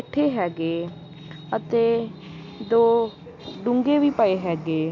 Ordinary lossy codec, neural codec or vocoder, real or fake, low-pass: AAC, 48 kbps; none; real; 7.2 kHz